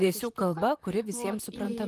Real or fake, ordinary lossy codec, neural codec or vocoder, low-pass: fake; Opus, 32 kbps; vocoder, 44.1 kHz, 128 mel bands, Pupu-Vocoder; 14.4 kHz